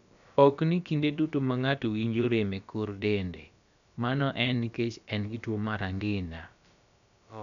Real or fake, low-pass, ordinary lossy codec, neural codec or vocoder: fake; 7.2 kHz; none; codec, 16 kHz, about 1 kbps, DyCAST, with the encoder's durations